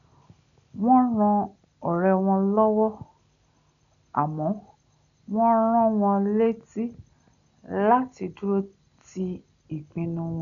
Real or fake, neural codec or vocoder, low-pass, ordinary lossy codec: real; none; 7.2 kHz; none